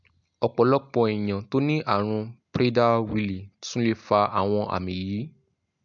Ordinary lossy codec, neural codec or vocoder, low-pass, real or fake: MP3, 64 kbps; none; 7.2 kHz; real